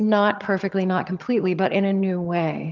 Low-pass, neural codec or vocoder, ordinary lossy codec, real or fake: 7.2 kHz; vocoder, 22.05 kHz, 80 mel bands, HiFi-GAN; Opus, 24 kbps; fake